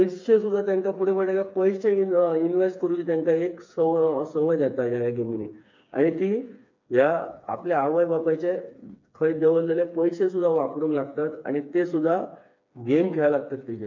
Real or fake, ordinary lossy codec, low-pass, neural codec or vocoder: fake; MP3, 48 kbps; 7.2 kHz; codec, 16 kHz, 4 kbps, FreqCodec, smaller model